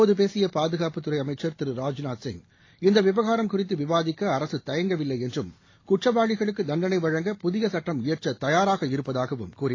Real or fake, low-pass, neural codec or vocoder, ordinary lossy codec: real; 7.2 kHz; none; AAC, 32 kbps